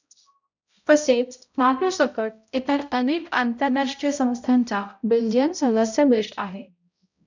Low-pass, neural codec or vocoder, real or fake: 7.2 kHz; codec, 16 kHz, 0.5 kbps, X-Codec, HuBERT features, trained on balanced general audio; fake